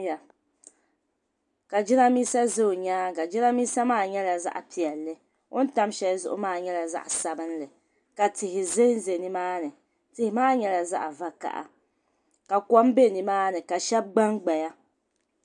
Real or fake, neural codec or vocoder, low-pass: real; none; 10.8 kHz